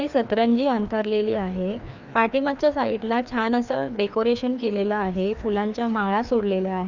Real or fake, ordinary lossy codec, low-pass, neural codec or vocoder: fake; none; 7.2 kHz; codec, 16 kHz, 2 kbps, FreqCodec, larger model